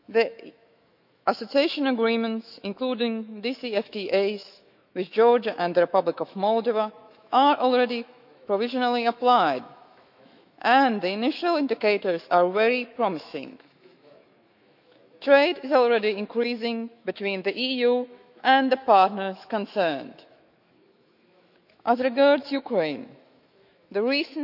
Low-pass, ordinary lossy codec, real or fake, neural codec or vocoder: 5.4 kHz; none; fake; autoencoder, 48 kHz, 128 numbers a frame, DAC-VAE, trained on Japanese speech